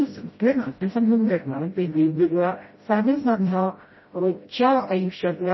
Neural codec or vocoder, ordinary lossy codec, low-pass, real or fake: codec, 16 kHz, 0.5 kbps, FreqCodec, smaller model; MP3, 24 kbps; 7.2 kHz; fake